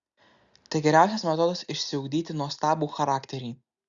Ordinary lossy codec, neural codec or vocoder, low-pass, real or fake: Opus, 64 kbps; none; 7.2 kHz; real